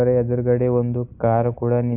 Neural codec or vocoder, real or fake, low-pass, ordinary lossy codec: none; real; 3.6 kHz; AAC, 32 kbps